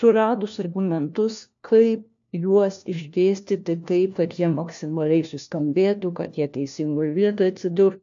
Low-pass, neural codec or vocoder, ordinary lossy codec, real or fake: 7.2 kHz; codec, 16 kHz, 1 kbps, FunCodec, trained on LibriTTS, 50 frames a second; AAC, 64 kbps; fake